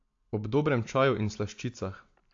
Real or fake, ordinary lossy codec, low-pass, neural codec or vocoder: real; AAC, 48 kbps; 7.2 kHz; none